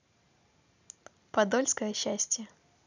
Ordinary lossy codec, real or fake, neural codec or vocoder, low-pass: none; real; none; 7.2 kHz